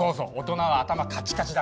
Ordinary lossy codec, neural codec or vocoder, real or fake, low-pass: none; none; real; none